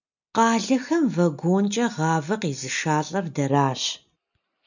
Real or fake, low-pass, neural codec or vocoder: real; 7.2 kHz; none